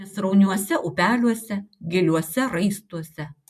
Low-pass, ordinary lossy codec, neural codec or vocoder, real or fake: 14.4 kHz; MP3, 64 kbps; none; real